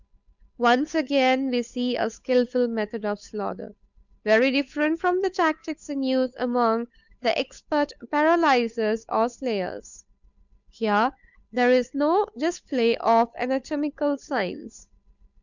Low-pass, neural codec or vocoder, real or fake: 7.2 kHz; codec, 16 kHz, 8 kbps, FunCodec, trained on Chinese and English, 25 frames a second; fake